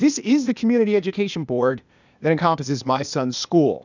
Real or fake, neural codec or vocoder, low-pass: fake; codec, 16 kHz, 0.8 kbps, ZipCodec; 7.2 kHz